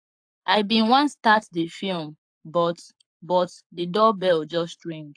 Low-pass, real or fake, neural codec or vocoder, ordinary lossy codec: 9.9 kHz; fake; codec, 44.1 kHz, 7.8 kbps, Pupu-Codec; AAC, 64 kbps